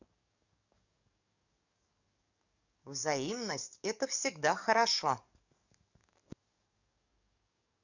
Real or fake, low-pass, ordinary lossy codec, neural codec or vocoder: fake; 7.2 kHz; none; codec, 44.1 kHz, 7.8 kbps, DAC